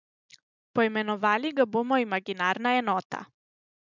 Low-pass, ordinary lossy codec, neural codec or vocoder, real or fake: 7.2 kHz; none; none; real